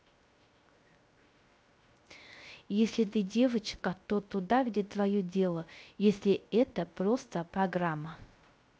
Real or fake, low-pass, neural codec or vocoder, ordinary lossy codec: fake; none; codec, 16 kHz, 0.3 kbps, FocalCodec; none